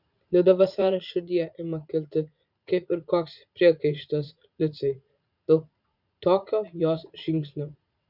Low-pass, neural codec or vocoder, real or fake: 5.4 kHz; vocoder, 44.1 kHz, 80 mel bands, Vocos; fake